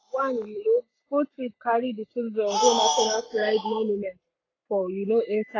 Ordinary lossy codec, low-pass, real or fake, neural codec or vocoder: AAC, 32 kbps; 7.2 kHz; fake; codec, 44.1 kHz, 7.8 kbps, Pupu-Codec